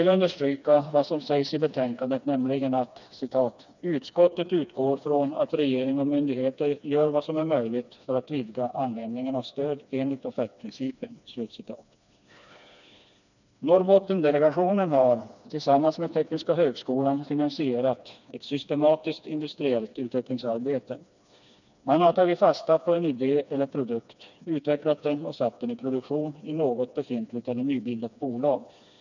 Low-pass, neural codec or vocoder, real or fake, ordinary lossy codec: 7.2 kHz; codec, 16 kHz, 2 kbps, FreqCodec, smaller model; fake; none